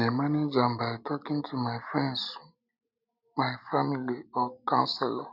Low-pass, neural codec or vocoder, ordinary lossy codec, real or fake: 5.4 kHz; none; none; real